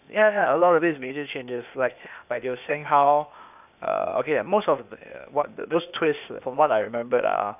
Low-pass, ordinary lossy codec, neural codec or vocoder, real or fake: 3.6 kHz; none; codec, 16 kHz, 0.8 kbps, ZipCodec; fake